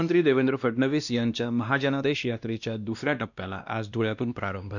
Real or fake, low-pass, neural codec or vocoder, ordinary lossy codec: fake; 7.2 kHz; codec, 16 kHz, 1 kbps, X-Codec, WavLM features, trained on Multilingual LibriSpeech; none